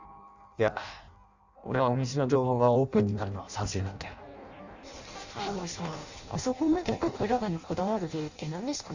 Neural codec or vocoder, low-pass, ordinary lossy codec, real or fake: codec, 16 kHz in and 24 kHz out, 0.6 kbps, FireRedTTS-2 codec; 7.2 kHz; Opus, 64 kbps; fake